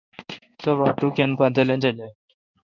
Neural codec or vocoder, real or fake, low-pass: codec, 16 kHz in and 24 kHz out, 1 kbps, XY-Tokenizer; fake; 7.2 kHz